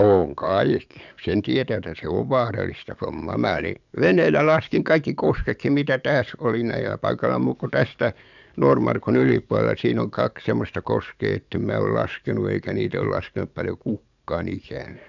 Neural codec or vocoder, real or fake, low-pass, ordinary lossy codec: codec, 44.1 kHz, 7.8 kbps, DAC; fake; 7.2 kHz; none